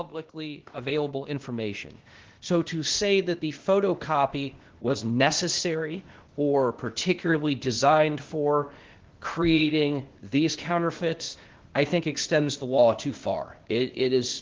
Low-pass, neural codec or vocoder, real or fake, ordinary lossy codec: 7.2 kHz; codec, 16 kHz, 0.8 kbps, ZipCodec; fake; Opus, 16 kbps